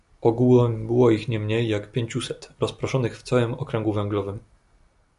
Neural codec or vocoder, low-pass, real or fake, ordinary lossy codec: none; 10.8 kHz; real; AAC, 96 kbps